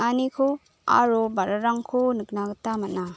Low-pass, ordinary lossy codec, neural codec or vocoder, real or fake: none; none; none; real